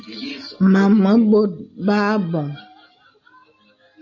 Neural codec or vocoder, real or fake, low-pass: none; real; 7.2 kHz